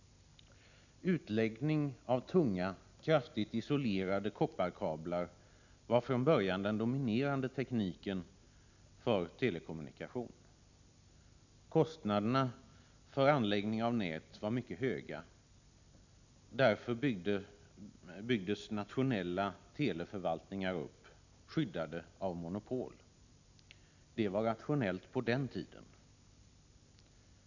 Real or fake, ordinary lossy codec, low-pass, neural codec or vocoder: real; none; 7.2 kHz; none